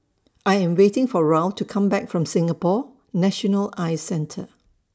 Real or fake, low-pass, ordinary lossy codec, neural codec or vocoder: real; none; none; none